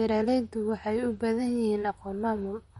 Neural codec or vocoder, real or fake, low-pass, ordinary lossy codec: vocoder, 44.1 kHz, 128 mel bands, Pupu-Vocoder; fake; 19.8 kHz; MP3, 48 kbps